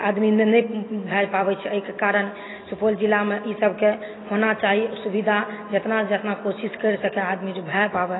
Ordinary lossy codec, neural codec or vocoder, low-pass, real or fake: AAC, 16 kbps; none; 7.2 kHz; real